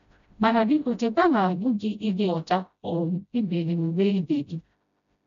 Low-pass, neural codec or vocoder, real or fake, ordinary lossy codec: 7.2 kHz; codec, 16 kHz, 0.5 kbps, FreqCodec, smaller model; fake; none